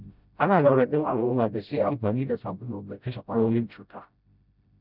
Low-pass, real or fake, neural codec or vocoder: 5.4 kHz; fake; codec, 16 kHz, 0.5 kbps, FreqCodec, smaller model